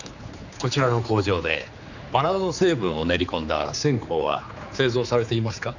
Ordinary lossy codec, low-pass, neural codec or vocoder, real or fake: none; 7.2 kHz; codec, 16 kHz, 4 kbps, X-Codec, HuBERT features, trained on general audio; fake